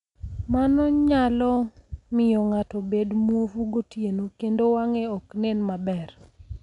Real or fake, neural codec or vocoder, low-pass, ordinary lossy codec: real; none; 10.8 kHz; none